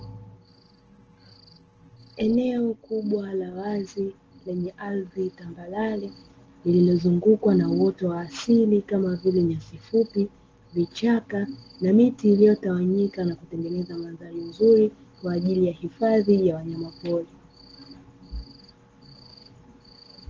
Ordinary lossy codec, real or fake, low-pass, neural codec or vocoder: Opus, 16 kbps; real; 7.2 kHz; none